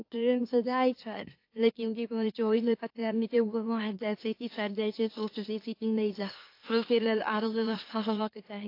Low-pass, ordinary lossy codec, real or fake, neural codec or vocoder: 5.4 kHz; AAC, 32 kbps; fake; autoencoder, 44.1 kHz, a latent of 192 numbers a frame, MeloTTS